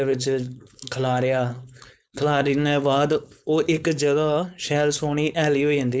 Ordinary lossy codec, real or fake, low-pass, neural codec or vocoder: none; fake; none; codec, 16 kHz, 4.8 kbps, FACodec